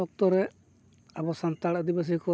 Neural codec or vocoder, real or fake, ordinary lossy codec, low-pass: none; real; none; none